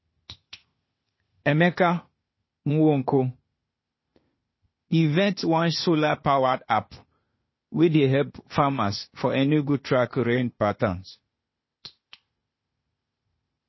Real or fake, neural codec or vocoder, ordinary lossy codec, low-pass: fake; codec, 16 kHz, 0.8 kbps, ZipCodec; MP3, 24 kbps; 7.2 kHz